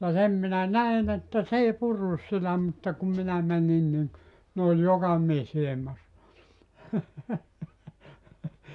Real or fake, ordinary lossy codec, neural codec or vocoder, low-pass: real; none; none; 10.8 kHz